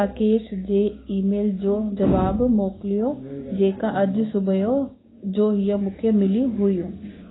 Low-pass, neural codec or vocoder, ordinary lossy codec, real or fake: 7.2 kHz; codec, 16 kHz, 6 kbps, DAC; AAC, 16 kbps; fake